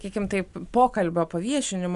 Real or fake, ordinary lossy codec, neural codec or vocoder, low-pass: real; Opus, 64 kbps; none; 10.8 kHz